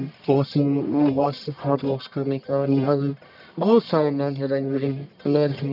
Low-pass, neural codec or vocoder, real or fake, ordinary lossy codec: 5.4 kHz; codec, 44.1 kHz, 1.7 kbps, Pupu-Codec; fake; none